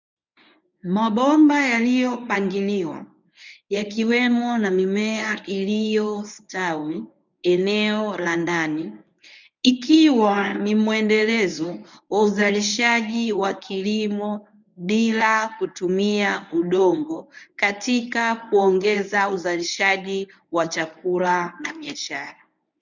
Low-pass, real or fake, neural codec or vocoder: 7.2 kHz; fake; codec, 24 kHz, 0.9 kbps, WavTokenizer, medium speech release version 1